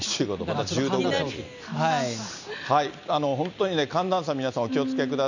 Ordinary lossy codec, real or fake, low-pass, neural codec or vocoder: none; real; 7.2 kHz; none